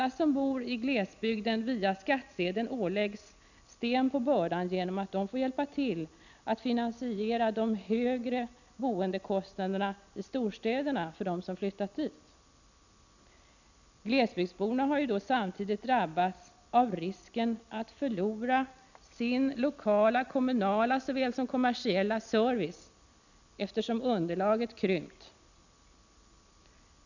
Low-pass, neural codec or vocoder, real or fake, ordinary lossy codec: 7.2 kHz; none; real; none